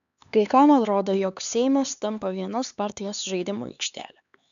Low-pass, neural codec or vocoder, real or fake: 7.2 kHz; codec, 16 kHz, 4 kbps, X-Codec, HuBERT features, trained on LibriSpeech; fake